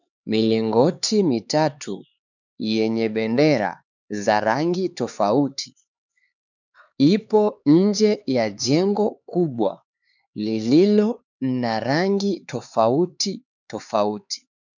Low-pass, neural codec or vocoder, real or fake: 7.2 kHz; codec, 16 kHz, 4 kbps, X-Codec, HuBERT features, trained on LibriSpeech; fake